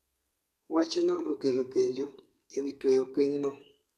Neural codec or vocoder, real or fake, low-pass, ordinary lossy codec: codec, 32 kHz, 1.9 kbps, SNAC; fake; 14.4 kHz; MP3, 96 kbps